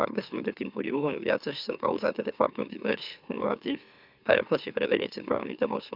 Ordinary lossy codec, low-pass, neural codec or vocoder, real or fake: none; 5.4 kHz; autoencoder, 44.1 kHz, a latent of 192 numbers a frame, MeloTTS; fake